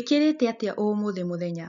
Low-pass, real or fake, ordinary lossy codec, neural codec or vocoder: 7.2 kHz; real; none; none